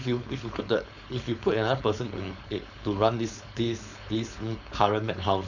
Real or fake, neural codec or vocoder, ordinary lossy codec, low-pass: fake; codec, 16 kHz, 4.8 kbps, FACodec; none; 7.2 kHz